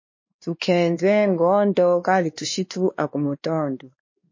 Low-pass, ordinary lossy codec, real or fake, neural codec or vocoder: 7.2 kHz; MP3, 32 kbps; fake; codec, 16 kHz, 2 kbps, X-Codec, WavLM features, trained on Multilingual LibriSpeech